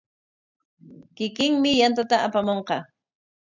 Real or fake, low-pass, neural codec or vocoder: real; 7.2 kHz; none